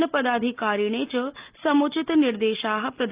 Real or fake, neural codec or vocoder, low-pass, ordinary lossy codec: real; none; 3.6 kHz; Opus, 32 kbps